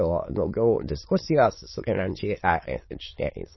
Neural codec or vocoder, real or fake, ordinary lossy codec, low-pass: autoencoder, 22.05 kHz, a latent of 192 numbers a frame, VITS, trained on many speakers; fake; MP3, 24 kbps; 7.2 kHz